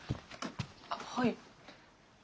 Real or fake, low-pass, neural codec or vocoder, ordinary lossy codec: real; none; none; none